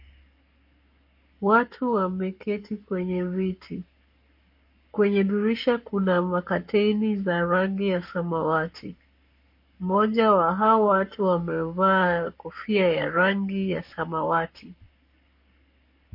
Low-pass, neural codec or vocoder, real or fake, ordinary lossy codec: 5.4 kHz; codec, 44.1 kHz, 7.8 kbps, Pupu-Codec; fake; MP3, 32 kbps